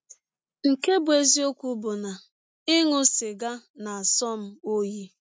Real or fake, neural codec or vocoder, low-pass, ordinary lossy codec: real; none; none; none